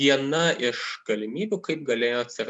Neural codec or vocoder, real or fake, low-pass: none; real; 10.8 kHz